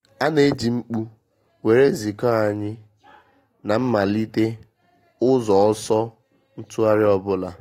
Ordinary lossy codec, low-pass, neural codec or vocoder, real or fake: AAC, 48 kbps; 19.8 kHz; none; real